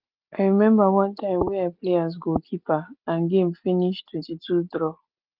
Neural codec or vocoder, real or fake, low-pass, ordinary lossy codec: none; real; 5.4 kHz; Opus, 32 kbps